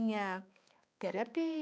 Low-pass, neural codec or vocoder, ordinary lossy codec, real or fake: none; codec, 16 kHz, 2 kbps, X-Codec, HuBERT features, trained on balanced general audio; none; fake